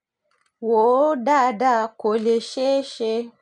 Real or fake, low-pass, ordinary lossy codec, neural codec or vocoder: real; 10.8 kHz; none; none